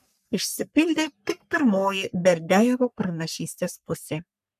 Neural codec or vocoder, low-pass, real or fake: codec, 44.1 kHz, 3.4 kbps, Pupu-Codec; 14.4 kHz; fake